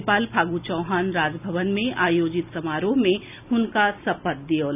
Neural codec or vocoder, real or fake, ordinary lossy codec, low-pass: none; real; none; 3.6 kHz